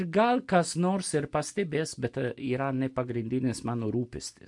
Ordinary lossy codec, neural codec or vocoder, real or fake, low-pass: MP3, 64 kbps; none; real; 10.8 kHz